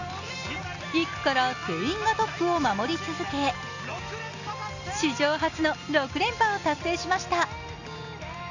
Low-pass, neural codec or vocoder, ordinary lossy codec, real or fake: 7.2 kHz; none; none; real